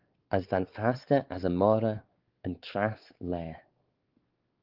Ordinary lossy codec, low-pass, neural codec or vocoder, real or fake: Opus, 16 kbps; 5.4 kHz; codec, 16 kHz, 4 kbps, X-Codec, WavLM features, trained on Multilingual LibriSpeech; fake